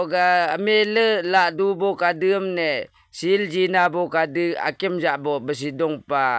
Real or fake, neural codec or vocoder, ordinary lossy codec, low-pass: real; none; none; none